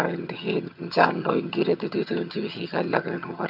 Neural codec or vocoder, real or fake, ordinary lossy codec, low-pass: vocoder, 22.05 kHz, 80 mel bands, HiFi-GAN; fake; none; 5.4 kHz